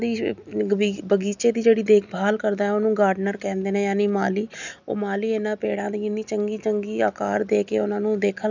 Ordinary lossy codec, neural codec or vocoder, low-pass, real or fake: none; none; 7.2 kHz; real